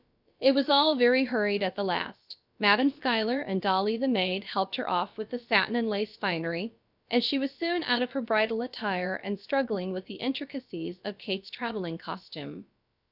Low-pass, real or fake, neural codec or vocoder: 5.4 kHz; fake; codec, 16 kHz, about 1 kbps, DyCAST, with the encoder's durations